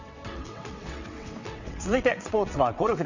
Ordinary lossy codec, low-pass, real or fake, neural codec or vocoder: none; 7.2 kHz; fake; vocoder, 22.05 kHz, 80 mel bands, WaveNeXt